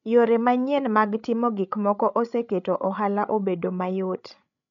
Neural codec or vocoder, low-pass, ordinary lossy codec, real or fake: codec, 16 kHz, 16 kbps, FreqCodec, larger model; 7.2 kHz; none; fake